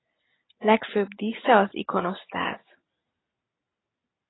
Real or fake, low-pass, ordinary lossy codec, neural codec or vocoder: real; 7.2 kHz; AAC, 16 kbps; none